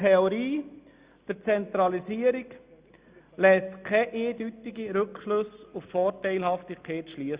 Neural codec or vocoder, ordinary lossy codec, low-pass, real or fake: none; Opus, 64 kbps; 3.6 kHz; real